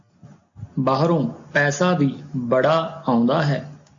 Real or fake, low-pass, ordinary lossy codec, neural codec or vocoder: real; 7.2 kHz; AAC, 64 kbps; none